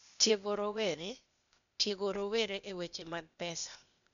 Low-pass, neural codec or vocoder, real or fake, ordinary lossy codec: 7.2 kHz; codec, 16 kHz, 0.8 kbps, ZipCodec; fake; none